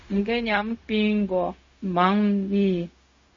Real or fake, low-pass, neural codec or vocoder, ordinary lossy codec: fake; 7.2 kHz; codec, 16 kHz, 0.4 kbps, LongCat-Audio-Codec; MP3, 32 kbps